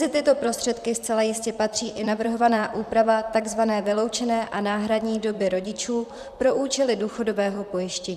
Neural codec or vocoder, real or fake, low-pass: vocoder, 44.1 kHz, 128 mel bands, Pupu-Vocoder; fake; 14.4 kHz